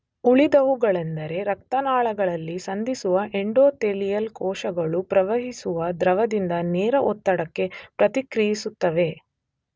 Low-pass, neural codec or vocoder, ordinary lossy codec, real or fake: none; none; none; real